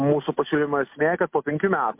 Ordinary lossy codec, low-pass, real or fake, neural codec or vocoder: MP3, 32 kbps; 3.6 kHz; real; none